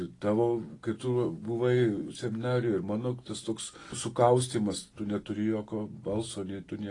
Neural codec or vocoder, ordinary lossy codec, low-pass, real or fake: none; AAC, 32 kbps; 10.8 kHz; real